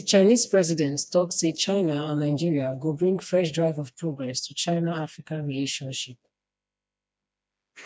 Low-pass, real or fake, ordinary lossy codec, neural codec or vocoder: none; fake; none; codec, 16 kHz, 2 kbps, FreqCodec, smaller model